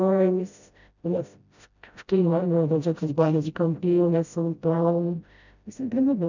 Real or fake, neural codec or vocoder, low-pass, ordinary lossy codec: fake; codec, 16 kHz, 0.5 kbps, FreqCodec, smaller model; 7.2 kHz; none